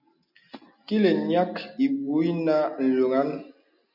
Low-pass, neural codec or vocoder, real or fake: 5.4 kHz; none; real